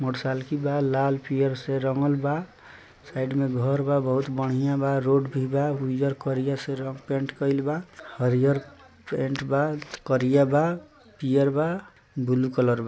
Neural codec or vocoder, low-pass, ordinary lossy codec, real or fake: none; none; none; real